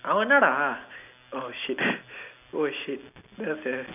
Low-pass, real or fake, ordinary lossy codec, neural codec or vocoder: 3.6 kHz; real; none; none